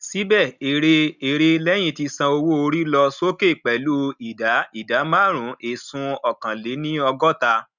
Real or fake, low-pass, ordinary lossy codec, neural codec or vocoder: real; 7.2 kHz; none; none